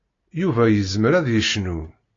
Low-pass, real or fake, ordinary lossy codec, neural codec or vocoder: 7.2 kHz; real; AAC, 32 kbps; none